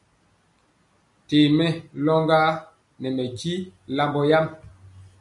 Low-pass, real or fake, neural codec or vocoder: 10.8 kHz; real; none